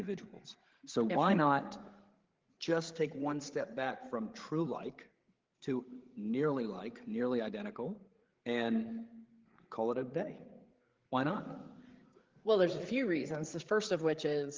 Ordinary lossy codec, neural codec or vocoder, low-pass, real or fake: Opus, 16 kbps; codec, 16 kHz, 8 kbps, FreqCodec, larger model; 7.2 kHz; fake